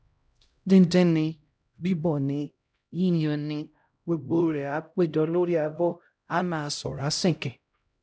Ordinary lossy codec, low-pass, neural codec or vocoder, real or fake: none; none; codec, 16 kHz, 0.5 kbps, X-Codec, HuBERT features, trained on LibriSpeech; fake